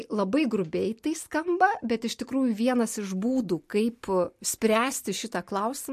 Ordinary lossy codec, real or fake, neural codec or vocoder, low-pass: MP3, 64 kbps; real; none; 14.4 kHz